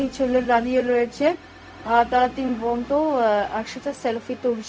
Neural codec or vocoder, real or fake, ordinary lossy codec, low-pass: codec, 16 kHz, 0.4 kbps, LongCat-Audio-Codec; fake; none; none